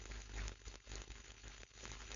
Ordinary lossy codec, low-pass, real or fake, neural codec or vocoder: AAC, 64 kbps; 7.2 kHz; fake; codec, 16 kHz, 4.8 kbps, FACodec